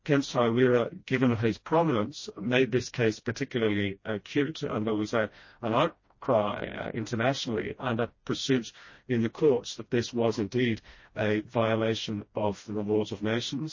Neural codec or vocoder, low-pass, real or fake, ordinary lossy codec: codec, 16 kHz, 1 kbps, FreqCodec, smaller model; 7.2 kHz; fake; MP3, 32 kbps